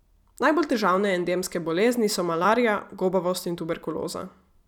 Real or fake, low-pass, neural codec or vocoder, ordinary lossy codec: fake; 19.8 kHz; vocoder, 44.1 kHz, 128 mel bands every 256 samples, BigVGAN v2; none